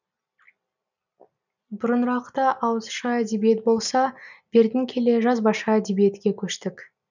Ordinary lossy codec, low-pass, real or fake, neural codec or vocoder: none; 7.2 kHz; real; none